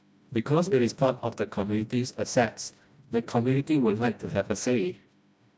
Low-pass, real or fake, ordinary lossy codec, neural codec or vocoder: none; fake; none; codec, 16 kHz, 1 kbps, FreqCodec, smaller model